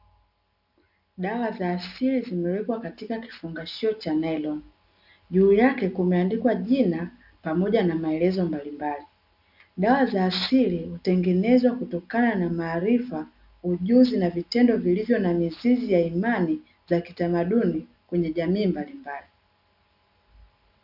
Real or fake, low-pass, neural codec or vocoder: real; 5.4 kHz; none